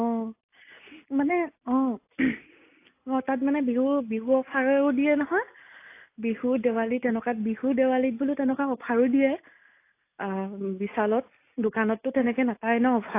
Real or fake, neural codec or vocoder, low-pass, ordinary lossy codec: real; none; 3.6 kHz; AAC, 24 kbps